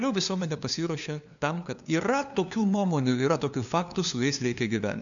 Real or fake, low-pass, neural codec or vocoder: fake; 7.2 kHz; codec, 16 kHz, 2 kbps, FunCodec, trained on LibriTTS, 25 frames a second